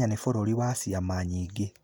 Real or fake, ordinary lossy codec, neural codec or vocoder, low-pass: real; none; none; none